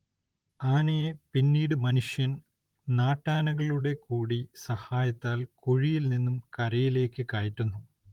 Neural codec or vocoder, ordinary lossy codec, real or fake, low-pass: vocoder, 44.1 kHz, 128 mel bands, Pupu-Vocoder; Opus, 24 kbps; fake; 19.8 kHz